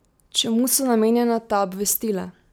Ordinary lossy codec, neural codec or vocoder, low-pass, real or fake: none; none; none; real